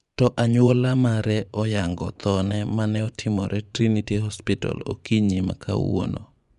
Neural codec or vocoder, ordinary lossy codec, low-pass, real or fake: vocoder, 24 kHz, 100 mel bands, Vocos; none; 10.8 kHz; fake